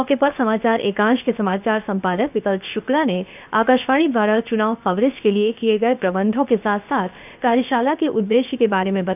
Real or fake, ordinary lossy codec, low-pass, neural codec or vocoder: fake; none; 3.6 kHz; codec, 16 kHz, 0.7 kbps, FocalCodec